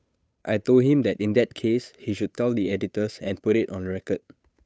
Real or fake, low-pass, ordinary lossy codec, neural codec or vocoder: fake; none; none; codec, 16 kHz, 8 kbps, FunCodec, trained on Chinese and English, 25 frames a second